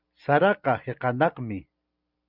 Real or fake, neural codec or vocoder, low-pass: real; none; 5.4 kHz